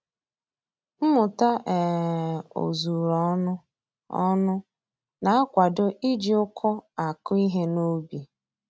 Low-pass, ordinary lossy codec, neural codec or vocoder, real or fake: none; none; none; real